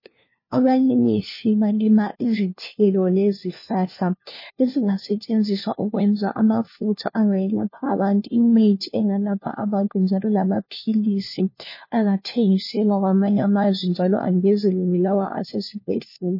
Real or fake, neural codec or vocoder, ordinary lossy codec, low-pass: fake; codec, 16 kHz, 1 kbps, FunCodec, trained on LibriTTS, 50 frames a second; MP3, 24 kbps; 5.4 kHz